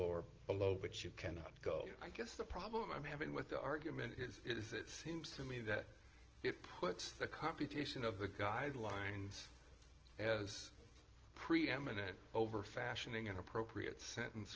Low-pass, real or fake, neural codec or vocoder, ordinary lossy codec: 7.2 kHz; real; none; Opus, 16 kbps